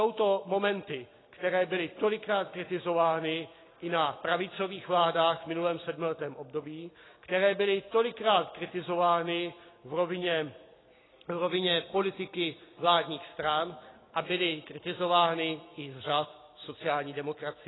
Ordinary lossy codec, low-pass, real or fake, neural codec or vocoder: AAC, 16 kbps; 7.2 kHz; real; none